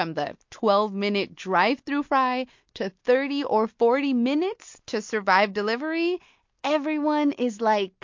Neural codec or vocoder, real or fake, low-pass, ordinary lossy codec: none; real; 7.2 kHz; MP3, 48 kbps